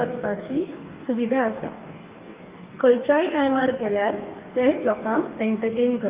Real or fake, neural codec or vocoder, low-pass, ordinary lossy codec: fake; codec, 44.1 kHz, 2.6 kbps, DAC; 3.6 kHz; Opus, 24 kbps